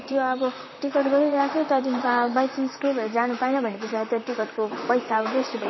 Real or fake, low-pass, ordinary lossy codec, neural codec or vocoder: fake; 7.2 kHz; MP3, 24 kbps; vocoder, 44.1 kHz, 128 mel bands, Pupu-Vocoder